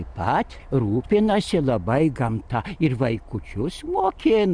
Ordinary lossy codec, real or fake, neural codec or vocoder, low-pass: Opus, 64 kbps; real; none; 9.9 kHz